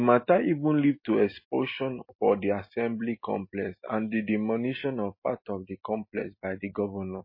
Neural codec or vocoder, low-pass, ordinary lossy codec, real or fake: none; 5.4 kHz; MP3, 24 kbps; real